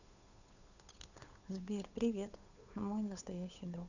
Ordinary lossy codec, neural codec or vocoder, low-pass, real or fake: none; codec, 16 kHz, 8 kbps, FunCodec, trained on Chinese and English, 25 frames a second; 7.2 kHz; fake